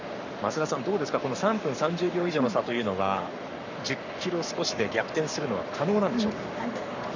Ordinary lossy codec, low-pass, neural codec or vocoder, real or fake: none; 7.2 kHz; codec, 44.1 kHz, 7.8 kbps, Pupu-Codec; fake